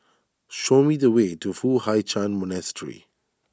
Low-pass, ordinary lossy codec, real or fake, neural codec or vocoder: none; none; real; none